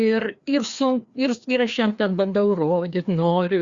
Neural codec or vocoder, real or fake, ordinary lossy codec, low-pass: codec, 16 kHz, 2 kbps, FreqCodec, larger model; fake; Opus, 64 kbps; 7.2 kHz